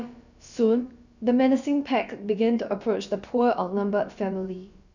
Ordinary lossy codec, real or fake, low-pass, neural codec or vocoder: none; fake; 7.2 kHz; codec, 16 kHz, about 1 kbps, DyCAST, with the encoder's durations